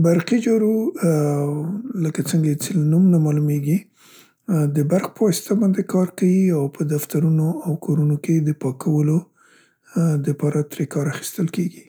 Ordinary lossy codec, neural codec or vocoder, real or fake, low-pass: none; none; real; none